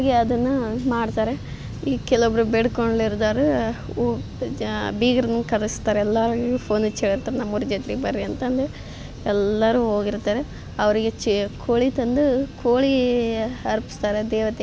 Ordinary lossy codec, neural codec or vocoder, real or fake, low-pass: none; none; real; none